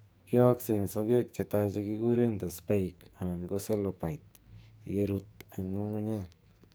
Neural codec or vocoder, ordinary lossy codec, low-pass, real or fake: codec, 44.1 kHz, 2.6 kbps, SNAC; none; none; fake